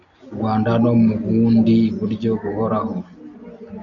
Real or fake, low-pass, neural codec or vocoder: real; 7.2 kHz; none